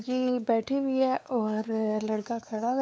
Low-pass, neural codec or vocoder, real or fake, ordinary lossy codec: none; codec, 16 kHz, 4 kbps, X-Codec, WavLM features, trained on Multilingual LibriSpeech; fake; none